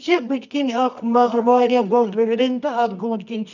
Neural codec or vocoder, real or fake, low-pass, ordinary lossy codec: codec, 24 kHz, 0.9 kbps, WavTokenizer, medium music audio release; fake; 7.2 kHz; none